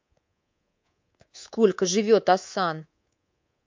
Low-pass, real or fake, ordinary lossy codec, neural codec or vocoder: 7.2 kHz; fake; MP3, 48 kbps; codec, 24 kHz, 3.1 kbps, DualCodec